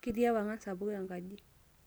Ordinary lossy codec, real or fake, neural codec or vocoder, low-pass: none; real; none; none